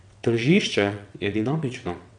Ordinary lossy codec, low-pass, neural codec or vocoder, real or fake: none; 9.9 kHz; vocoder, 22.05 kHz, 80 mel bands, WaveNeXt; fake